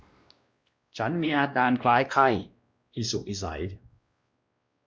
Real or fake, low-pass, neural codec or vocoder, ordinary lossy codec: fake; none; codec, 16 kHz, 1 kbps, X-Codec, WavLM features, trained on Multilingual LibriSpeech; none